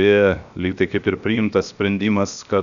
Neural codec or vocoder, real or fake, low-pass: codec, 16 kHz, 0.7 kbps, FocalCodec; fake; 7.2 kHz